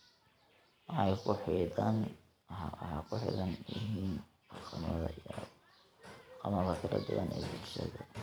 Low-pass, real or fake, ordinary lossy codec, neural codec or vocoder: none; fake; none; vocoder, 44.1 kHz, 128 mel bands every 512 samples, BigVGAN v2